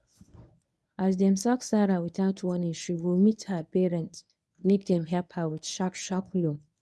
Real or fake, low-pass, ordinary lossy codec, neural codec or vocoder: fake; none; none; codec, 24 kHz, 0.9 kbps, WavTokenizer, medium speech release version 1